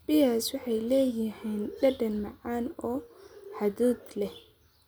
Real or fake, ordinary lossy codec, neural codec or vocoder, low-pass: real; none; none; none